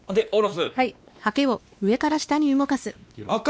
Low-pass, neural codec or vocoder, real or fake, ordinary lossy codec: none; codec, 16 kHz, 2 kbps, X-Codec, WavLM features, trained on Multilingual LibriSpeech; fake; none